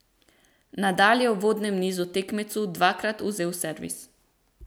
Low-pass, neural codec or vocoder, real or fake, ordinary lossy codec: none; none; real; none